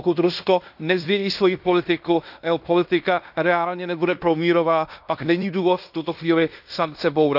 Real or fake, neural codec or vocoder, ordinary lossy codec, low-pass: fake; codec, 16 kHz in and 24 kHz out, 0.9 kbps, LongCat-Audio-Codec, fine tuned four codebook decoder; none; 5.4 kHz